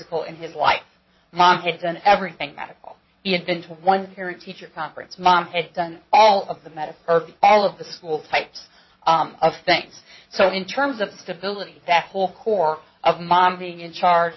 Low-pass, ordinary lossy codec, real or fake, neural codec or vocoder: 7.2 kHz; MP3, 24 kbps; fake; vocoder, 22.05 kHz, 80 mel bands, WaveNeXt